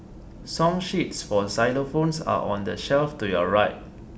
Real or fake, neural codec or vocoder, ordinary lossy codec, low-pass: real; none; none; none